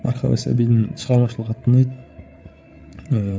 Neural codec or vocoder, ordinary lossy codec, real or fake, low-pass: codec, 16 kHz, 8 kbps, FreqCodec, larger model; none; fake; none